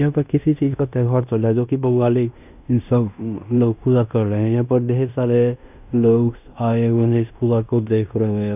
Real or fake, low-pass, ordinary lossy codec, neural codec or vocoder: fake; 3.6 kHz; none; codec, 16 kHz in and 24 kHz out, 0.9 kbps, LongCat-Audio-Codec, fine tuned four codebook decoder